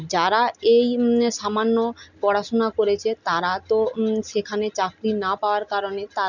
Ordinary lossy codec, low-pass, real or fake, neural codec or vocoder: none; 7.2 kHz; real; none